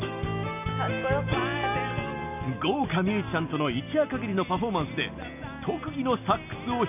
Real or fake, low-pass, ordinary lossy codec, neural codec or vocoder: real; 3.6 kHz; none; none